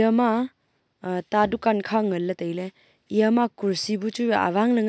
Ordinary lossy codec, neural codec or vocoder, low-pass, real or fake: none; none; none; real